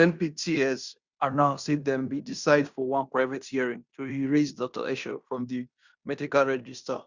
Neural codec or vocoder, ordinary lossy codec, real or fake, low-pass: codec, 16 kHz in and 24 kHz out, 0.9 kbps, LongCat-Audio-Codec, fine tuned four codebook decoder; Opus, 64 kbps; fake; 7.2 kHz